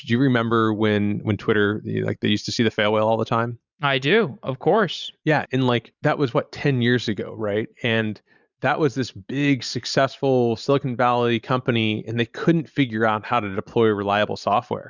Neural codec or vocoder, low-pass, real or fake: vocoder, 44.1 kHz, 128 mel bands every 512 samples, BigVGAN v2; 7.2 kHz; fake